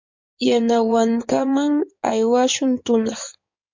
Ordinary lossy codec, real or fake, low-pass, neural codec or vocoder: MP3, 48 kbps; fake; 7.2 kHz; codec, 16 kHz in and 24 kHz out, 2.2 kbps, FireRedTTS-2 codec